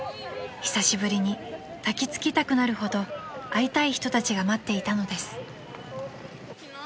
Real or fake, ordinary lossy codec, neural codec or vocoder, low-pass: real; none; none; none